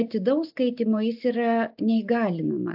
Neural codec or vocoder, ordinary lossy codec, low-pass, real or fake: none; AAC, 48 kbps; 5.4 kHz; real